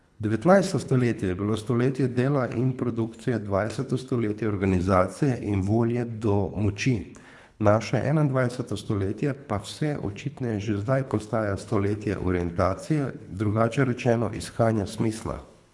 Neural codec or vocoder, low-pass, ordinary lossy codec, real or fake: codec, 24 kHz, 3 kbps, HILCodec; none; none; fake